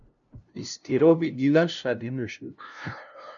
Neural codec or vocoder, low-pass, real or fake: codec, 16 kHz, 0.5 kbps, FunCodec, trained on LibriTTS, 25 frames a second; 7.2 kHz; fake